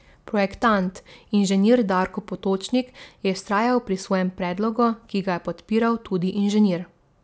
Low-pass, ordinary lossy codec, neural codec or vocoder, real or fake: none; none; none; real